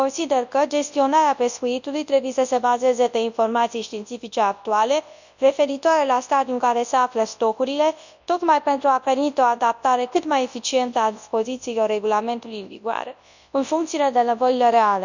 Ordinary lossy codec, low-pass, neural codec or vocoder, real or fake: none; 7.2 kHz; codec, 24 kHz, 0.9 kbps, WavTokenizer, large speech release; fake